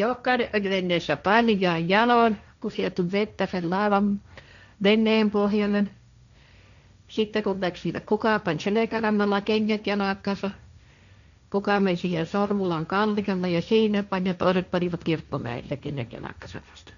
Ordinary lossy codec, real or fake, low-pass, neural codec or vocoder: none; fake; 7.2 kHz; codec, 16 kHz, 1.1 kbps, Voila-Tokenizer